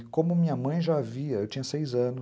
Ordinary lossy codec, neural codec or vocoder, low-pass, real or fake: none; none; none; real